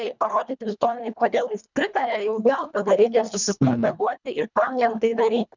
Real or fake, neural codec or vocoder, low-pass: fake; codec, 24 kHz, 1.5 kbps, HILCodec; 7.2 kHz